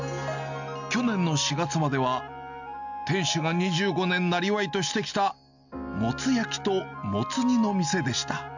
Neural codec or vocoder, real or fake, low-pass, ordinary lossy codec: none; real; 7.2 kHz; none